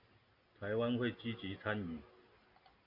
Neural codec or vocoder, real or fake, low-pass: none; real; 5.4 kHz